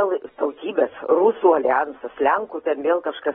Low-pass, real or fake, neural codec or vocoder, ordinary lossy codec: 7.2 kHz; real; none; AAC, 16 kbps